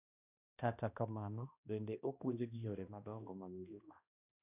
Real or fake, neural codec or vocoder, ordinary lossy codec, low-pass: fake; codec, 16 kHz, 1 kbps, X-Codec, HuBERT features, trained on balanced general audio; none; 3.6 kHz